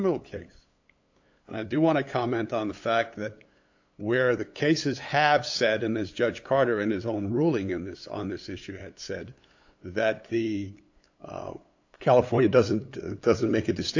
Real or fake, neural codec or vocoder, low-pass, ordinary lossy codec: fake; codec, 16 kHz, 8 kbps, FunCodec, trained on LibriTTS, 25 frames a second; 7.2 kHz; AAC, 48 kbps